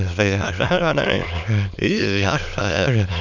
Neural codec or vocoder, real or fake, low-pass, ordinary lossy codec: autoencoder, 22.05 kHz, a latent of 192 numbers a frame, VITS, trained on many speakers; fake; 7.2 kHz; none